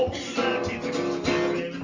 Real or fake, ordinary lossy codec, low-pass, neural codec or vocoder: real; Opus, 32 kbps; 7.2 kHz; none